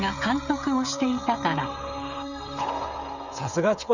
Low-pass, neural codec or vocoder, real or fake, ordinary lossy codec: 7.2 kHz; codec, 16 kHz, 16 kbps, FreqCodec, smaller model; fake; none